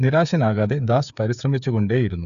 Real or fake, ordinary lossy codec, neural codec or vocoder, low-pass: fake; none; codec, 16 kHz, 8 kbps, FreqCodec, smaller model; 7.2 kHz